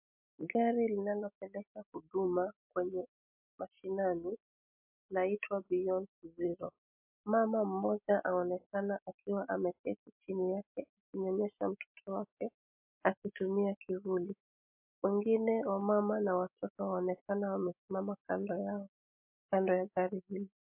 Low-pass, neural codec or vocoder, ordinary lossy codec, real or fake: 3.6 kHz; none; MP3, 32 kbps; real